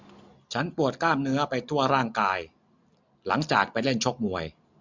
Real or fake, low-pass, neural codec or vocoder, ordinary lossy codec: real; 7.2 kHz; none; MP3, 64 kbps